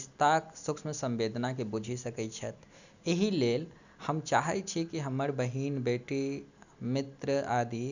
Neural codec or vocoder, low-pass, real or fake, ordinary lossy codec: none; 7.2 kHz; real; none